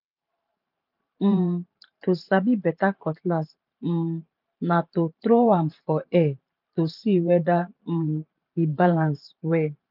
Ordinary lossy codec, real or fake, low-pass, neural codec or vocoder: none; fake; 5.4 kHz; vocoder, 44.1 kHz, 128 mel bands every 512 samples, BigVGAN v2